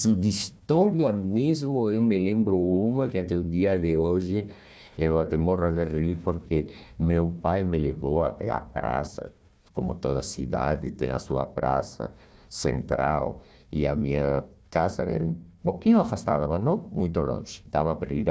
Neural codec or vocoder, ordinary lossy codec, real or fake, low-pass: codec, 16 kHz, 1 kbps, FunCodec, trained on Chinese and English, 50 frames a second; none; fake; none